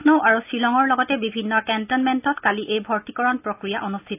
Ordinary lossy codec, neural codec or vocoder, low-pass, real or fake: AAC, 32 kbps; none; 3.6 kHz; real